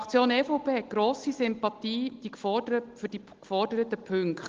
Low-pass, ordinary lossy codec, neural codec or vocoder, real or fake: 7.2 kHz; Opus, 16 kbps; none; real